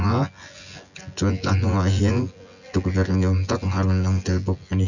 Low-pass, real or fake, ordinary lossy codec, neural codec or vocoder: 7.2 kHz; fake; none; vocoder, 24 kHz, 100 mel bands, Vocos